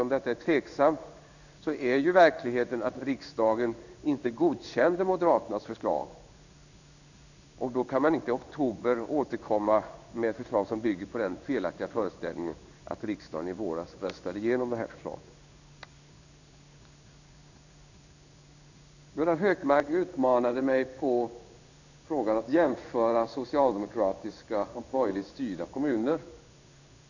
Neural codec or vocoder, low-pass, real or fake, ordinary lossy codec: codec, 16 kHz in and 24 kHz out, 1 kbps, XY-Tokenizer; 7.2 kHz; fake; none